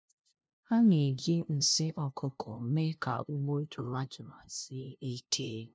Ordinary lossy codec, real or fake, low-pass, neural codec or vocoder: none; fake; none; codec, 16 kHz, 0.5 kbps, FunCodec, trained on LibriTTS, 25 frames a second